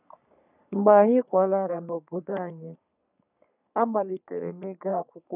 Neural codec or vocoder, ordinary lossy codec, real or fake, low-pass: codec, 44.1 kHz, 3.4 kbps, Pupu-Codec; none; fake; 3.6 kHz